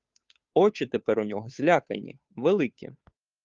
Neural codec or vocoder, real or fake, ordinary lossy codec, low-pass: codec, 16 kHz, 2 kbps, FunCodec, trained on Chinese and English, 25 frames a second; fake; Opus, 32 kbps; 7.2 kHz